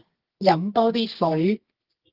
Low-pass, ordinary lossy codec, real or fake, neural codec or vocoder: 5.4 kHz; Opus, 16 kbps; fake; codec, 24 kHz, 0.9 kbps, WavTokenizer, medium music audio release